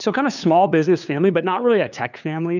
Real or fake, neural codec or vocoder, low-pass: fake; codec, 16 kHz, 2 kbps, FunCodec, trained on LibriTTS, 25 frames a second; 7.2 kHz